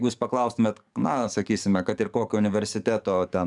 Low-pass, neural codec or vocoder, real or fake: 10.8 kHz; codec, 44.1 kHz, 7.8 kbps, DAC; fake